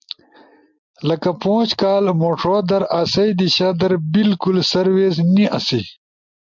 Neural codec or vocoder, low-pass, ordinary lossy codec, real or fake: none; 7.2 kHz; MP3, 64 kbps; real